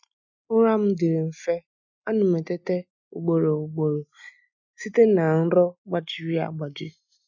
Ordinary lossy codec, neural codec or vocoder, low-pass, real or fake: none; none; 7.2 kHz; real